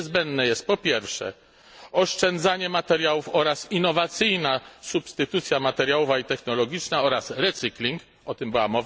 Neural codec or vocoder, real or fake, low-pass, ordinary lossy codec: none; real; none; none